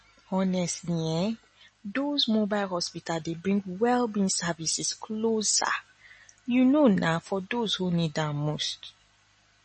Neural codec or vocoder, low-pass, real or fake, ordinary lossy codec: none; 10.8 kHz; real; MP3, 32 kbps